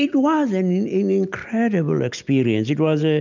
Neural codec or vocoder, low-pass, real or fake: none; 7.2 kHz; real